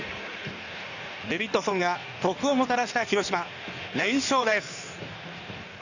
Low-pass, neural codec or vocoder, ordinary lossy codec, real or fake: 7.2 kHz; codec, 16 kHz in and 24 kHz out, 1.1 kbps, FireRedTTS-2 codec; none; fake